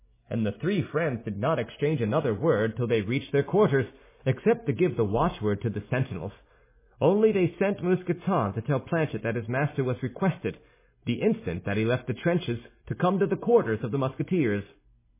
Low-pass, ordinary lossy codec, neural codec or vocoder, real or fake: 3.6 kHz; MP3, 16 kbps; none; real